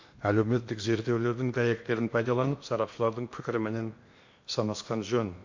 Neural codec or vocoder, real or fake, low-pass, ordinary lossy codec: codec, 16 kHz in and 24 kHz out, 0.8 kbps, FocalCodec, streaming, 65536 codes; fake; 7.2 kHz; MP3, 48 kbps